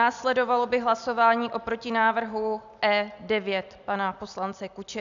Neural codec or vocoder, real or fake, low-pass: none; real; 7.2 kHz